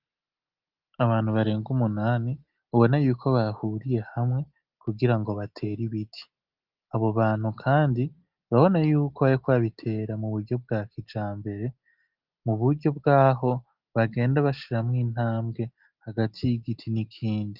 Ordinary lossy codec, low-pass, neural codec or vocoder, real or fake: Opus, 32 kbps; 5.4 kHz; none; real